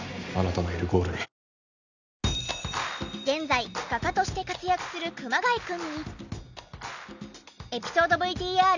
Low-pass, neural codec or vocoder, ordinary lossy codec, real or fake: 7.2 kHz; none; none; real